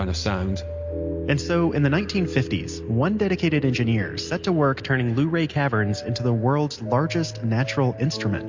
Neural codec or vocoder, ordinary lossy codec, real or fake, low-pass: none; MP3, 48 kbps; real; 7.2 kHz